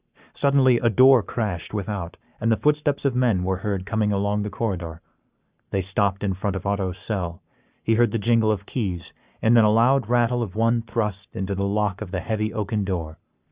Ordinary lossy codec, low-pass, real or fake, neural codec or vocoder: Opus, 64 kbps; 3.6 kHz; fake; codec, 44.1 kHz, 7.8 kbps, Pupu-Codec